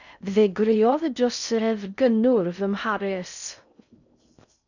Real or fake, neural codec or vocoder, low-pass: fake; codec, 16 kHz in and 24 kHz out, 0.6 kbps, FocalCodec, streaming, 4096 codes; 7.2 kHz